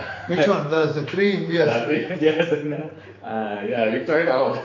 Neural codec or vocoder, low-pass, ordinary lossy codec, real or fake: codec, 16 kHz, 4 kbps, X-Codec, HuBERT features, trained on general audio; 7.2 kHz; AAC, 32 kbps; fake